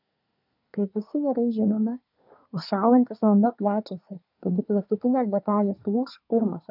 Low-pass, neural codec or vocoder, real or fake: 5.4 kHz; codec, 24 kHz, 1 kbps, SNAC; fake